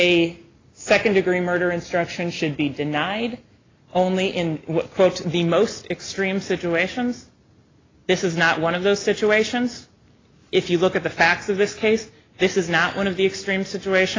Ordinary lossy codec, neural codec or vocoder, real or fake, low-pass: AAC, 32 kbps; none; real; 7.2 kHz